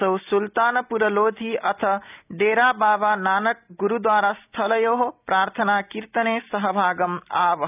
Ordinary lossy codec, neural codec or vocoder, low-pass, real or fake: none; none; 3.6 kHz; real